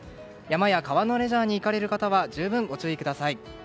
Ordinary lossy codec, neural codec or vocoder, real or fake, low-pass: none; none; real; none